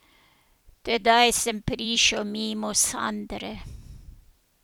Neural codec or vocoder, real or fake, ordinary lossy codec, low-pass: vocoder, 44.1 kHz, 128 mel bands every 256 samples, BigVGAN v2; fake; none; none